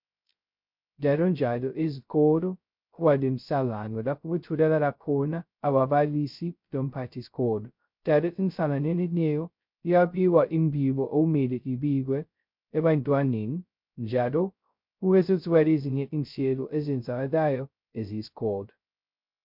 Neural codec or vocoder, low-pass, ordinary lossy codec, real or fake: codec, 16 kHz, 0.2 kbps, FocalCodec; 5.4 kHz; MP3, 48 kbps; fake